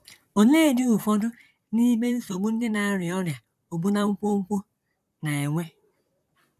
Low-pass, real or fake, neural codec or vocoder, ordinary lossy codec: 14.4 kHz; fake; vocoder, 44.1 kHz, 128 mel bands, Pupu-Vocoder; none